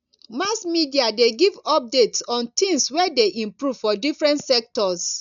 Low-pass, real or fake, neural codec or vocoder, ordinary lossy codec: 7.2 kHz; real; none; none